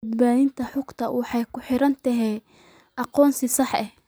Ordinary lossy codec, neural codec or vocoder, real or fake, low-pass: none; vocoder, 44.1 kHz, 128 mel bands every 256 samples, BigVGAN v2; fake; none